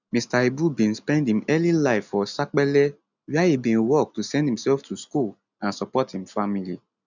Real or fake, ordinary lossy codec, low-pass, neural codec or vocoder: real; none; 7.2 kHz; none